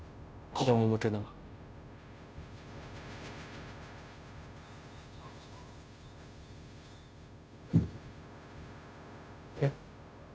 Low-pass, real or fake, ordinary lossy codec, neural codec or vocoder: none; fake; none; codec, 16 kHz, 0.5 kbps, FunCodec, trained on Chinese and English, 25 frames a second